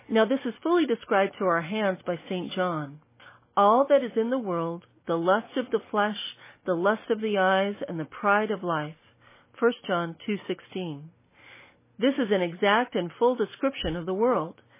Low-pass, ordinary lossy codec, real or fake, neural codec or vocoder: 3.6 kHz; MP3, 16 kbps; real; none